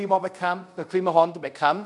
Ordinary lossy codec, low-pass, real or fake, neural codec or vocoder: none; 10.8 kHz; fake; codec, 24 kHz, 0.5 kbps, DualCodec